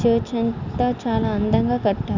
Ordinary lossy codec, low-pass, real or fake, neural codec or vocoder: none; 7.2 kHz; real; none